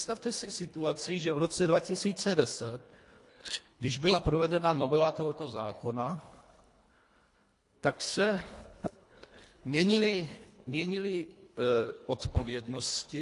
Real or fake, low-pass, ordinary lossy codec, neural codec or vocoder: fake; 10.8 kHz; AAC, 48 kbps; codec, 24 kHz, 1.5 kbps, HILCodec